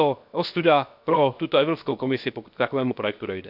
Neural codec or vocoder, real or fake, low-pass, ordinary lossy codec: codec, 16 kHz, 0.7 kbps, FocalCodec; fake; 5.4 kHz; none